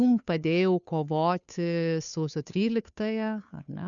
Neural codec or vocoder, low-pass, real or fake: codec, 16 kHz, 2 kbps, FunCodec, trained on Chinese and English, 25 frames a second; 7.2 kHz; fake